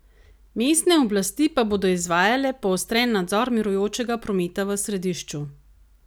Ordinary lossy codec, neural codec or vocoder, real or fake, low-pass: none; none; real; none